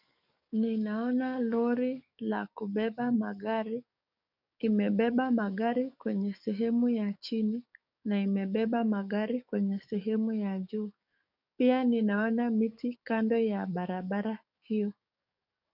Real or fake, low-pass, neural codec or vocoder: fake; 5.4 kHz; codec, 44.1 kHz, 7.8 kbps, DAC